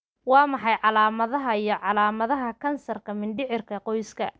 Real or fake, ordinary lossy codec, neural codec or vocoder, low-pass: real; none; none; none